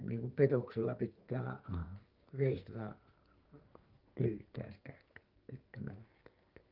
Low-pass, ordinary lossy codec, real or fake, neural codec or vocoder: 5.4 kHz; Opus, 32 kbps; fake; codec, 44.1 kHz, 2.6 kbps, SNAC